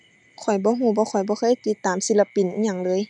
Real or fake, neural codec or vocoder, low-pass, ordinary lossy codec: real; none; 10.8 kHz; none